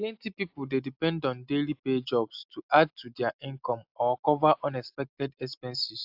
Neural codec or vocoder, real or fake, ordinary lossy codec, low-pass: none; real; AAC, 48 kbps; 5.4 kHz